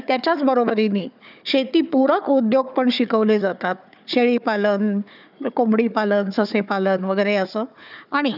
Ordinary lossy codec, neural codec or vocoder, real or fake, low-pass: none; codec, 16 kHz, 4 kbps, FunCodec, trained on Chinese and English, 50 frames a second; fake; 5.4 kHz